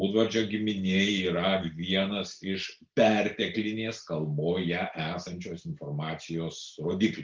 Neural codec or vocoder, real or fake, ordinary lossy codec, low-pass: none; real; Opus, 24 kbps; 7.2 kHz